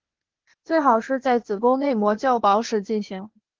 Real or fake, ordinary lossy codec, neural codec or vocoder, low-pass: fake; Opus, 16 kbps; codec, 16 kHz, 0.8 kbps, ZipCodec; 7.2 kHz